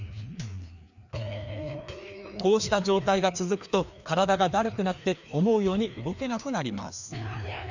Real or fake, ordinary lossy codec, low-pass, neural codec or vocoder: fake; none; 7.2 kHz; codec, 16 kHz, 2 kbps, FreqCodec, larger model